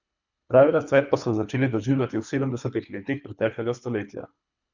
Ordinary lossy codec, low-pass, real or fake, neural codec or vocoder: none; 7.2 kHz; fake; codec, 24 kHz, 3 kbps, HILCodec